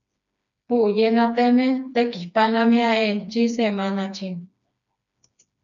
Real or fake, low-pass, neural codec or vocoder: fake; 7.2 kHz; codec, 16 kHz, 2 kbps, FreqCodec, smaller model